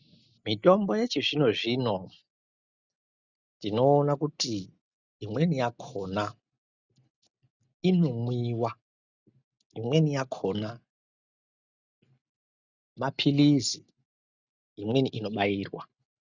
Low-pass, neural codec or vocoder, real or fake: 7.2 kHz; none; real